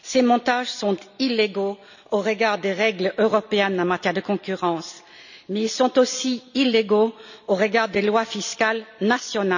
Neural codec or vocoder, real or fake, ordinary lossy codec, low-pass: none; real; none; 7.2 kHz